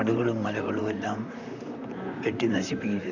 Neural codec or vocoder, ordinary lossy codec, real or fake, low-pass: vocoder, 44.1 kHz, 128 mel bands, Pupu-Vocoder; none; fake; 7.2 kHz